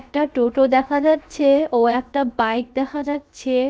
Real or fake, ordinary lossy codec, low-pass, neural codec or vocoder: fake; none; none; codec, 16 kHz, 0.7 kbps, FocalCodec